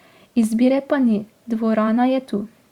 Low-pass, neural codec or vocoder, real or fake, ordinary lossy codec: 19.8 kHz; vocoder, 44.1 kHz, 128 mel bands every 512 samples, BigVGAN v2; fake; Opus, 64 kbps